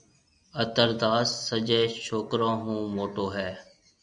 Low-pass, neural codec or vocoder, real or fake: 9.9 kHz; none; real